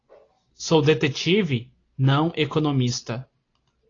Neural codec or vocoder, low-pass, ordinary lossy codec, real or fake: none; 7.2 kHz; AAC, 32 kbps; real